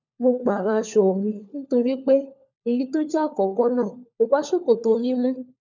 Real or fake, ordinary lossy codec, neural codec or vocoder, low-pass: fake; none; codec, 16 kHz, 4 kbps, FunCodec, trained on LibriTTS, 50 frames a second; 7.2 kHz